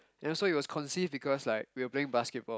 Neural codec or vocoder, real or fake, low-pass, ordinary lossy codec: none; real; none; none